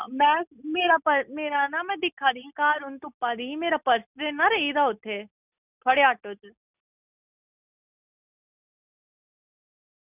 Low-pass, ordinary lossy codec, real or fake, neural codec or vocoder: 3.6 kHz; none; real; none